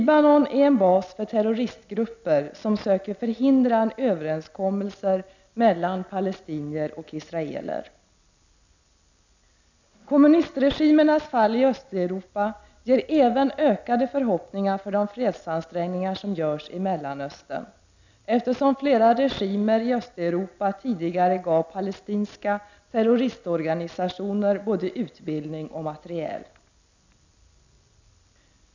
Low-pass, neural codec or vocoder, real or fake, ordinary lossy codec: 7.2 kHz; none; real; none